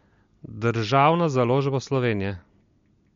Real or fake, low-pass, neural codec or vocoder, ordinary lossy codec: real; 7.2 kHz; none; MP3, 48 kbps